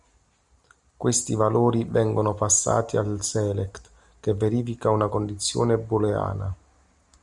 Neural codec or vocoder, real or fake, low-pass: none; real; 10.8 kHz